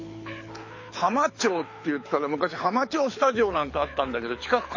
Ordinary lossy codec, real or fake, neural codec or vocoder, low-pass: MP3, 32 kbps; fake; codec, 24 kHz, 6 kbps, HILCodec; 7.2 kHz